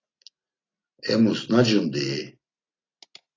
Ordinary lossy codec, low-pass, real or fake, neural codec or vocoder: AAC, 32 kbps; 7.2 kHz; real; none